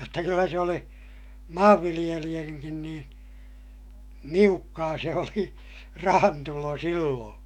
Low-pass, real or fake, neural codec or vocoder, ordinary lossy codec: 19.8 kHz; fake; autoencoder, 48 kHz, 128 numbers a frame, DAC-VAE, trained on Japanese speech; none